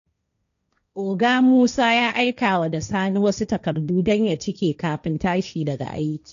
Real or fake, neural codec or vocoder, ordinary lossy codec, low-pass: fake; codec, 16 kHz, 1.1 kbps, Voila-Tokenizer; none; 7.2 kHz